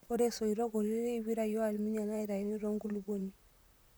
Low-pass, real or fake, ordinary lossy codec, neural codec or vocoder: none; fake; none; vocoder, 44.1 kHz, 128 mel bands, Pupu-Vocoder